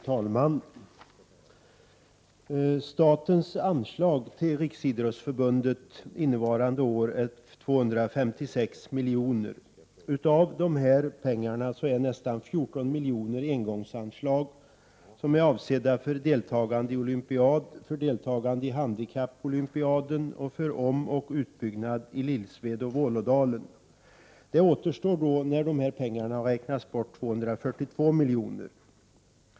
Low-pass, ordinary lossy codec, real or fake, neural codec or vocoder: none; none; real; none